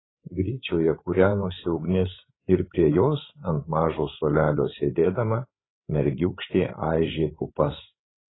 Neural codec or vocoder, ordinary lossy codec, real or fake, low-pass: codec, 16 kHz, 8 kbps, FreqCodec, larger model; AAC, 16 kbps; fake; 7.2 kHz